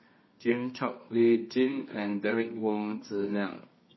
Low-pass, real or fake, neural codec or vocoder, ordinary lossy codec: 7.2 kHz; fake; codec, 24 kHz, 0.9 kbps, WavTokenizer, medium music audio release; MP3, 24 kbps